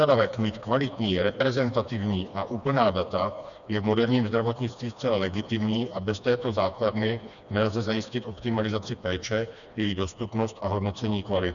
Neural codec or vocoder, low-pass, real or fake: codec, 16 kHz, 2 kbps, FreqCodec, smaller model; 7.2 kHz; fake